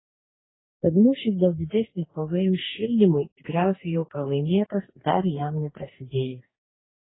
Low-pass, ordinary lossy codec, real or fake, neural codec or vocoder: 7.2 kHz; AAC, 16 kbps; fake; codec, 44.1 kHz, 2.6 kbps, DAC